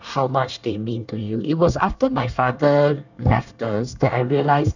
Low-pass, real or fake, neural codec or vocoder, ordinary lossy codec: 7.2 kHz; fake; codec, 24 kHz, 1 kbps, SNAC; none